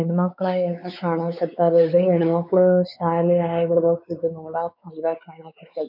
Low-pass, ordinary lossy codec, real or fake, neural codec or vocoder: 5.4 kHz; none; fake; codec, 16 kHz, 4 kbps, X-Codec, WavLM features, trained on Multilingual LibriSpeech